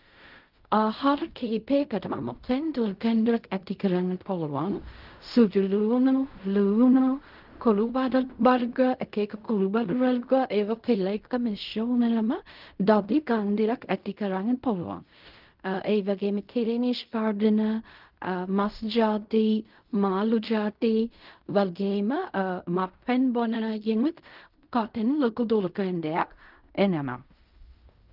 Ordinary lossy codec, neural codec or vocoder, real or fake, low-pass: Opus, 32 kbps; codec, 16 kHz in and 24 kHz out, 0.4 kbps, LongCat-Audio-Codec, fine tuned four codebook decoder; fake; 5.4 kHz